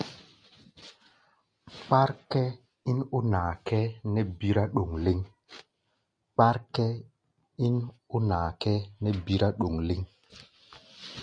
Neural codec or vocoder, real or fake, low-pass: none; real; 9.9 kHz